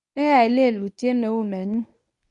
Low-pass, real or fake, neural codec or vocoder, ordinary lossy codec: 10.8 kHz; fake; codec, 24 kHz, 0.9 kbps, WavTokenizer, medium speech release version 1; none